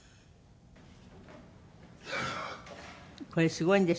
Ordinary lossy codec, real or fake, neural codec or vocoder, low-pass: none; real; none; none